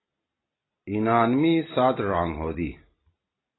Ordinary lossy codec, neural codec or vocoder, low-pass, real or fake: AAC, 16 kbps; none; 7.2 kHz; real